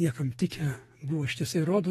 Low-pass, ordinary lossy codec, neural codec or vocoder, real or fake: 14.4 kHz; AAC, 32 kbps; codec, 32 kHz, 1.9 kbps, SNAC; fake